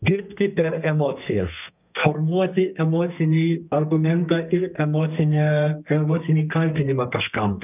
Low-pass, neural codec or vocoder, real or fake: 3.6 kHz; codec, 32 kHz, 1.9 kbps, SNAC; fake